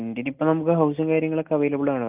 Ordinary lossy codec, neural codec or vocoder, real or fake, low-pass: Opus, 32 kbps; none; real; 3.6 kHz